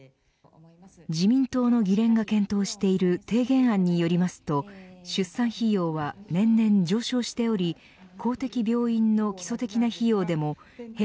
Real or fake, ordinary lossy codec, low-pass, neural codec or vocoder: real; none; none; none